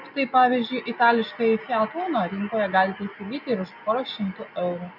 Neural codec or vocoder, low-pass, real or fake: none; 5.4 kHz; real